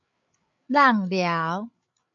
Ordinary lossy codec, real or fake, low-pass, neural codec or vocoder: AAC, 64 kbps; fake; 7.2 kHz; codec, 16 kHz, 8 kbps, FreqCodec, larger model